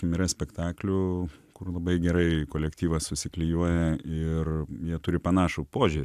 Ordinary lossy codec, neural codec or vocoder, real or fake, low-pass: AAC, 96 kbps; none; real; 14.4 kHz